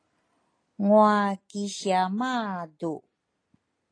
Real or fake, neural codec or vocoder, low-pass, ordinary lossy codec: real; none; 9.9 kHz; AAC, 32 kbps